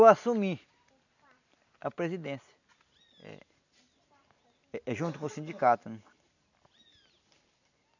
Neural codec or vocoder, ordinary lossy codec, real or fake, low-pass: none; none; real; 7.2 kHz